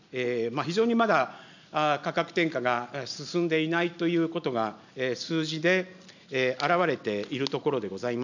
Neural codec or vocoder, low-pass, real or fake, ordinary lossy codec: none; 7.2 kHz; real; none